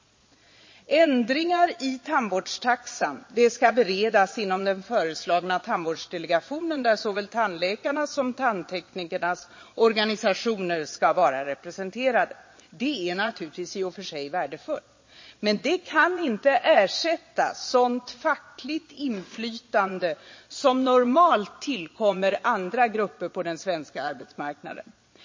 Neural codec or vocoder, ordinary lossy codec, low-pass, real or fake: vocoder, 22.05 kHz, 80 mel bands, Vocos; MP3, 32 kbps; 7.2 kHz; fake